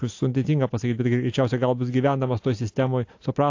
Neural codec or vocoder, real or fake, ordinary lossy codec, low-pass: none; real; AAC, 48 kbps; 7.2 kHz